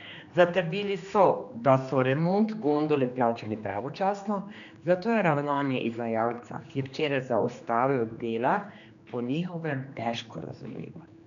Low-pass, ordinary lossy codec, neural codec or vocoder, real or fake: 7.2 kHz; none; codec, 16 kHz, 2 kbps, X-Codec, HuBERT features, trained on general audio; fake